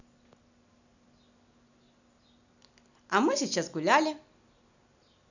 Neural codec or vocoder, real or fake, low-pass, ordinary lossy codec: none; real; 7.2 kHz; none